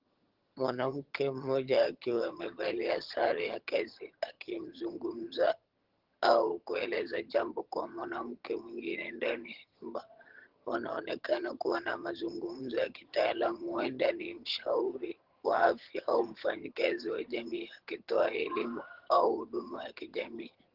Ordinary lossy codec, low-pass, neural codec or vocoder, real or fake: Opus, 16 kbps; 5.4 kHz; vocoder, 22.05 kHz, 80 mel bands, HiFi-GAN; fake